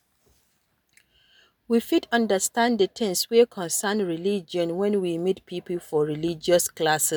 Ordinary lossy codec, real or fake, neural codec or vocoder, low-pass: none; real; none; none